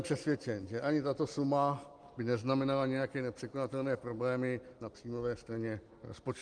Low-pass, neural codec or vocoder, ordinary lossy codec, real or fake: 9.9 kHz; none; Opus, 24 kbps; real